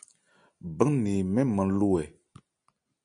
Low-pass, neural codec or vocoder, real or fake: 9.9 kHz; none; real